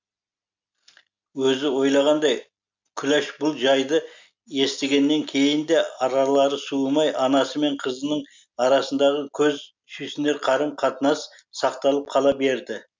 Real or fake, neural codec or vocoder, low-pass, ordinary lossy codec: real; none; 7.2 kHz; MP3, 64 kbps